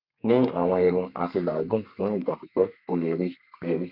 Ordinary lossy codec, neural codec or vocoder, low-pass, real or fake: none; codec, 32 kHz, 1.9 kbps, SNAC; 5.4 kHz; fake